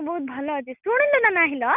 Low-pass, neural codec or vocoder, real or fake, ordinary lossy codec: 3.6 kHz; none; real; none